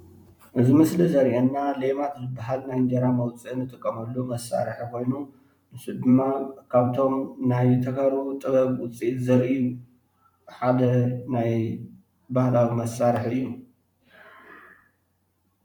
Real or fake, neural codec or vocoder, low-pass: fake; vocoder, 44.1 kHz, 128 mel bands every 512 samples, BigVGAN v2; 19.8 kHz